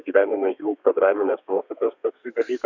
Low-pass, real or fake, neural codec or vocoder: 7.2 kHz; fake; codec, 32 kHz, 1.9 kbps, SNAC